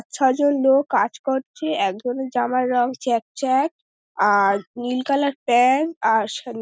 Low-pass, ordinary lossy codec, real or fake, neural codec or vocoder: none; none; real; none